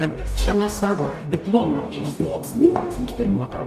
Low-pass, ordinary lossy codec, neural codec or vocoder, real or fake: 14.4 kHz; Opus, 64 kbps; codec, 44.1 kHz, 0.9 kbps, DAC; fake